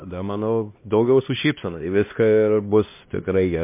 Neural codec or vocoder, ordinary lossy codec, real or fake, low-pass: codec, 16 kHz, 1 kbps, X-Codec, HuBERT features, trained on LibriSpeech; MP3, 24 kbps; fake; 3.6 kHz